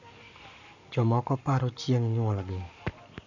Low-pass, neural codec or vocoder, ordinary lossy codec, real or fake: 7.2 kHz; codec, 44.1 kHz, 7.8 kbps, Pupu-Codec; none; fake